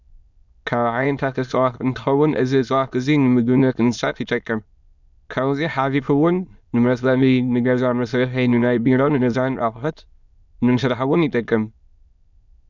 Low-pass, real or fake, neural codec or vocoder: 7.2 kHz; fake; autoencoder, 22.05 kHz, a latent of 192 numbers a frame, VITS, trained on many speakers